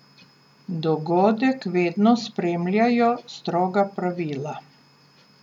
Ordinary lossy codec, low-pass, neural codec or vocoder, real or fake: none; 19.8 kHz; none; real